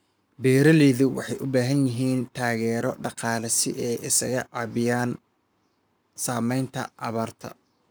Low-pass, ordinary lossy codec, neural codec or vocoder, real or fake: none; none; codec, 44.1 kHz, 7.8 kbps, Pupu-Codec; fake